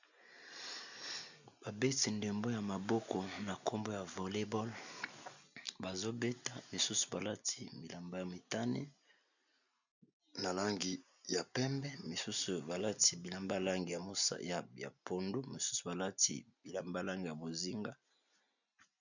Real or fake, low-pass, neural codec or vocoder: real; 7.2 kHz; none